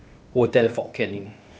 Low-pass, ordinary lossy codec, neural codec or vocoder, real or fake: none; none; codec, 16 kHz, 0.8 kbps, ZipCodec; fake